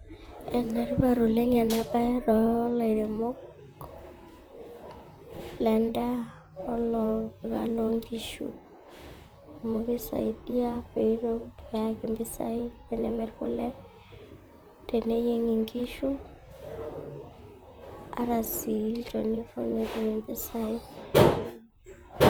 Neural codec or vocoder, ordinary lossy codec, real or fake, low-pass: vocoder, 44.1 kHz, 128 mel bands, Pupu-Vocoder; none; fake; none